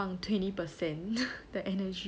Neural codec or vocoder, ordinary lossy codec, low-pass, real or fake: none; none; none; real